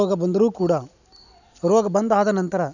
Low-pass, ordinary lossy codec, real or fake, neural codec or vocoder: 7.2 kHz; none; real; none